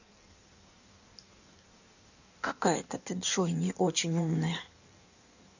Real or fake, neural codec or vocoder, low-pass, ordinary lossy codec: fake; codec, 16 kHz in and 24 kHz out, 1.1 kbps, FireRedTTS-2 codec; 7.2 kHz; none